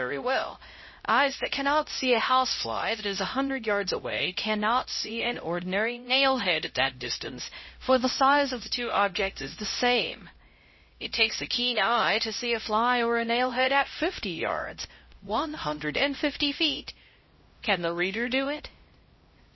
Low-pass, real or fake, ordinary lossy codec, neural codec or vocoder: 7.2 kHz; fake; MP3, 24 kbps; codec, 16 kHz, 0.5 kbps, X-Codec, HuBERT features, trained on LibriSpeech